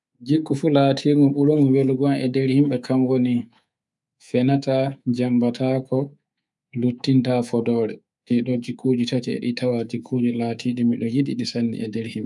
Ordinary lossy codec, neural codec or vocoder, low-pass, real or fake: none; codec, 24 kHz, 3.1 kbps, DualCodec; 10.8 kHz; fake